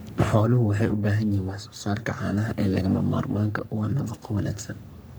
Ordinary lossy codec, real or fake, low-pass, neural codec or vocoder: none; fake; none; codec, 44.1 kHz, 3.4 kbps, Pupu-Codec